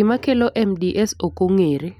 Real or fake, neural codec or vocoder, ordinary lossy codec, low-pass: real; none; none; 19.8 kHz